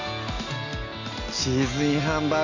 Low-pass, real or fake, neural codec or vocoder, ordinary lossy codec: 7.2 kHz; real; none; none